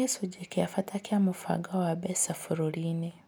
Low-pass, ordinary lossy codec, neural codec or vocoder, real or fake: none; none; none; real